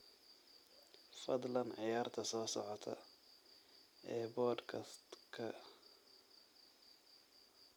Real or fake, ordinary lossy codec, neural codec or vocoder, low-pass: real; none; none; none